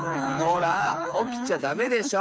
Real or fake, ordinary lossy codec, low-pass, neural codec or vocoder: fake; none; none; codec, 16 kHz, 4 kbps, FreqCodec, smaller model